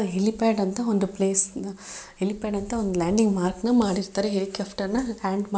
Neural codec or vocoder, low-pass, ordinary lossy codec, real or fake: none; none; none; real